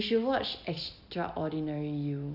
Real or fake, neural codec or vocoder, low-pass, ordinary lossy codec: real; none; 5.4 kHz; none